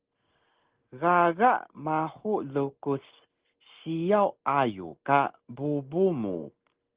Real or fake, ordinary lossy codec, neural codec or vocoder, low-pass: real; Opus, 16 kbps; none; 3.6 kHz